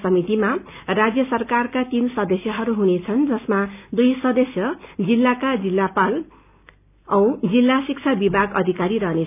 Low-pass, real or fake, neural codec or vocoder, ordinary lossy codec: 3.6 kHz; real; none; none